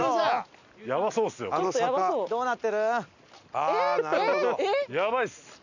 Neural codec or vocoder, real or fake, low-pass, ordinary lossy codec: none; real; 7.2 kHz; none